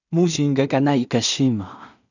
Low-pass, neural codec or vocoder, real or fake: 7.2 kHz; codec, 16 kHz in and 24 kHz out, 0.4 kbps, LongCat-Audio-Codec, two codebook decoder; fake